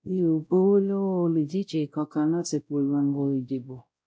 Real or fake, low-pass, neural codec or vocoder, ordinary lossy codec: fake; none; codec, 16 kHz, 0.5 kbps, X-Codec, WavLM features, trained on Multilingual LibriSpeech; none